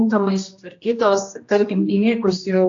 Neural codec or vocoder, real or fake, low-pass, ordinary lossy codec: codec, 16 kHz, 1 kbps, X-Codec, HuBERT features, trained on general audio; fake; 7.2 kHz; AAC, 48 kbps